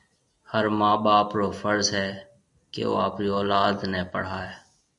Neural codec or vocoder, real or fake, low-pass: none; real; 10.8 kHz